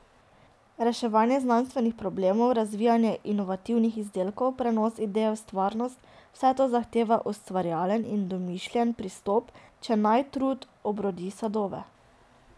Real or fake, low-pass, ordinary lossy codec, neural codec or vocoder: real; none; none; none